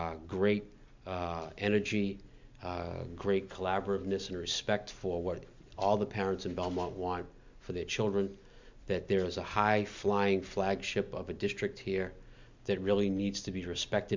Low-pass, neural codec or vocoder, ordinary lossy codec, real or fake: 7.2 kHz; none; MP3, 64 kbps; real